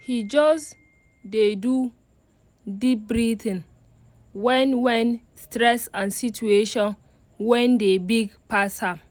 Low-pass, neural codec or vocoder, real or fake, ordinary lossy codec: none; none; real; none